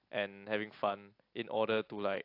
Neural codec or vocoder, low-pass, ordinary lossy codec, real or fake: none; 5.4 kHz; AAC, 32 kbps; real